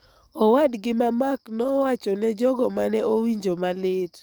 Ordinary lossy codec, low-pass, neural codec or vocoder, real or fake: none; none; codec, 44.1 kHz, 7.8 kbps, DAC; fake